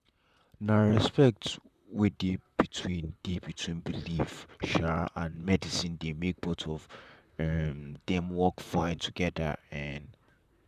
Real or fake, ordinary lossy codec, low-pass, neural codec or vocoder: fake; none; 14.4 kHz; vocoder, 44.1 kHz, 128 mel bands, Pupu-Vocoder